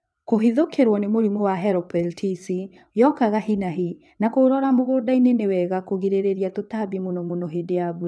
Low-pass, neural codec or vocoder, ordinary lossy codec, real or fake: none; vocoder, 22.05 kHz, 80 mel bands, WaveNeXt; none; fake